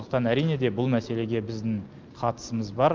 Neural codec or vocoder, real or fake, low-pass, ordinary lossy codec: none; real; 7.2 kHz; Opus, 32 kbps